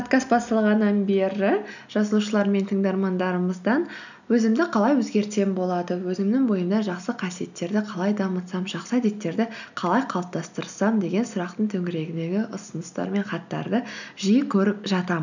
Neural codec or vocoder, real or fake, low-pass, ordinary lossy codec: none; real; 7.2 kHz; none